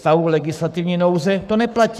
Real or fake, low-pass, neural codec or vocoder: fake; 14.4 kHz; codec, 44.1 kHz, 7.8 kbps, Pupu-Codec